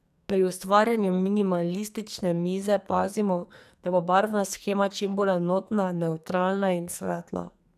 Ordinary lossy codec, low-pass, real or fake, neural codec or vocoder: none; 14.4 kHz; fake; codec, 44.1 kHz, 2.6 kbps, SNAC